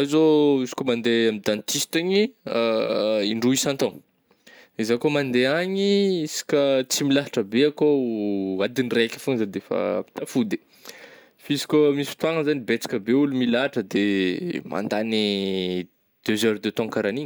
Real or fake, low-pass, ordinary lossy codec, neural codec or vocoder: real; none; none; none